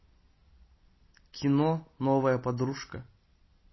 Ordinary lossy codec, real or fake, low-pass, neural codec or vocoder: MP3, 24 kbps; real; 7.2 kHz; none